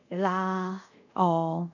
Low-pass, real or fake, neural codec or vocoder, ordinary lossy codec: 7.2 kHz; fake; codec, 24 kHz, 0.5 kbps, DualCodec; none